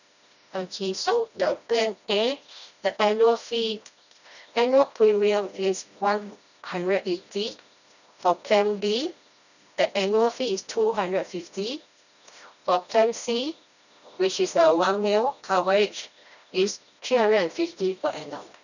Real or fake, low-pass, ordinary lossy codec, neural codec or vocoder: fake; 7.2 kHz; none; codec, 16 kHz, 1 kbps, FreqCodec, smaller model